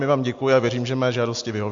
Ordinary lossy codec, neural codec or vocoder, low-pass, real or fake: AAC, 64 kbps; none; 7.2 kHz; real